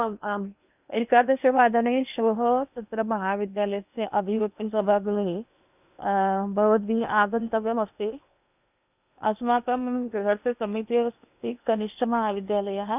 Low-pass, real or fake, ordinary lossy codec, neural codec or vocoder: 3.6 kHz; fake; none; codec, 16 kHz in and 24 kHz out, 0.8 kbps, FocalCodec, streaming, 65536 codes